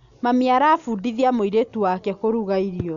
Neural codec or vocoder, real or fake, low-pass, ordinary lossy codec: none; real; 7.2 kHz; none